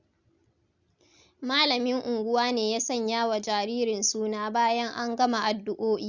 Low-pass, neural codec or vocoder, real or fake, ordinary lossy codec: 7.2 kHz; none; real; Opus, 64 kbps